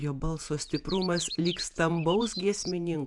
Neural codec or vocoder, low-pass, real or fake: vocoder, 48 kHz, 128 mel bands, Vocos; 10.8 kHz; fake